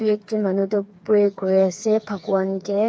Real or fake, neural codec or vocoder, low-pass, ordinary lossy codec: fake; codec, 16 kHz, 4 kbps, FreqCodec, smaller model; none; none